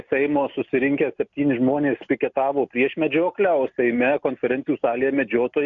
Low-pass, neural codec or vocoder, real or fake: 7.2 kHz; none; real